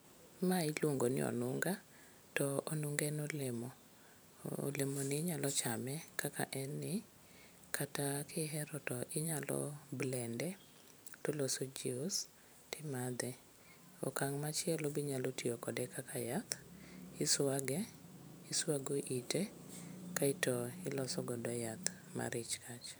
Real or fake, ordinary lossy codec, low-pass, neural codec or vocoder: fake; none; none; vocoder, 44.1 kHz, 128 mel bands every 512 samples, BigVGAN v2